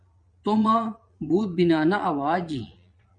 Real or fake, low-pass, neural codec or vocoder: fake; 9.9 kHz; vocoder, 22.05 kHz, 80 mel bands, Vocos